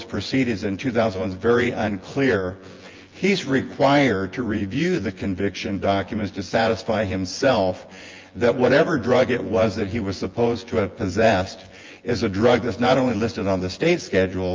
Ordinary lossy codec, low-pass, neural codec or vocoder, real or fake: Opus, 32 kbps; 7.2 kHz; vocoder, 24 kHz, 100 mel bands, Vocos; fake